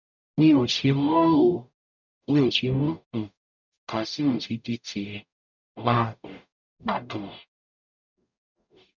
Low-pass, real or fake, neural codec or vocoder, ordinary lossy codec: 7.2 kHz; fake; codec, 44.1 kHz, 0.9 kbps, DAC; none